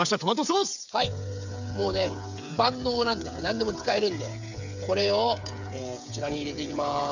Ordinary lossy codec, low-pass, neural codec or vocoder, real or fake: none; 7.2 kHz; codec, 16 kHz, 8 kbps, FreqCodec, smaller model; fake